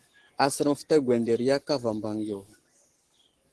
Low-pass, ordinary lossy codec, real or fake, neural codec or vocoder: 10.8 kHz; Opus, 16 kbps; fake; codec, 44.1 kHz, 7.8 kbps, Pupu-Codec